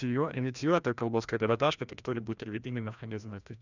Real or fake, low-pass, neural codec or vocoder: fake; 7.2 kHz; codec, 16 kHz, 1 kbps, FreqCodec, larger model